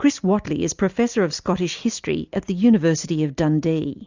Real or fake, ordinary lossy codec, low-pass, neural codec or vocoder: real; Opus, 64 kbps; 7.2 kHz; none